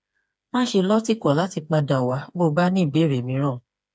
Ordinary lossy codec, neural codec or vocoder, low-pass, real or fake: none; codec, 16 kHz, 4 kbps, FreqCodec, smaller model; none; fake